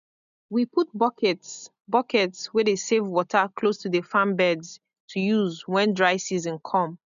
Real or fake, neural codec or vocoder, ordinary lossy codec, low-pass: real; none; none; 7.2 kHz